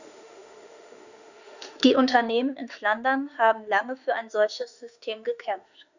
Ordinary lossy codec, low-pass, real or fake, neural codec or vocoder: none; 7.2 kHz; fake; autoencoder, 48 kHz, 32 numbers a frame, DAC-VAE, trained on Japanese speech